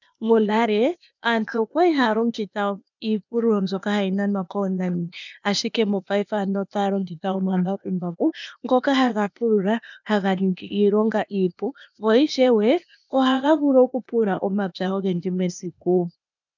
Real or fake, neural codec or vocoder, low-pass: fake; codec, 16 kHz, 0.8 kbps, ZipCodec; 7.2 kHz